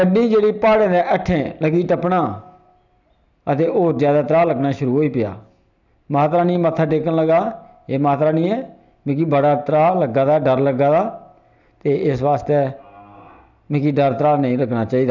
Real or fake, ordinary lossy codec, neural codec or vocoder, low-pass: real; none; none; 7.2 kHz